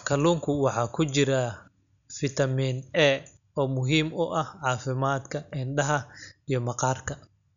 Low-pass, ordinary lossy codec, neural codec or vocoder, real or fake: 7.2 kHz; none; none; real